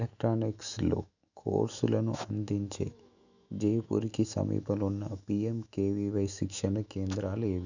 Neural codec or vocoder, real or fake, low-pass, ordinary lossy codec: autoencoder, 48 kHz, 128 numbers a frame, DAC-VAE, trained on Japanese speech; fake; 7.2 kHz; none